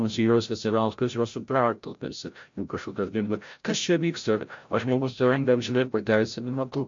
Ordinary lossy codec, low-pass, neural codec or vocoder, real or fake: MP3, 48 kbps; 7.2 kHz; codec, 16 kHz, 0.5 kbps, FreqCodec, larger model; fake